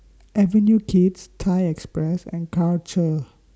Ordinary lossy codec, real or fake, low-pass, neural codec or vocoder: none; real; none; none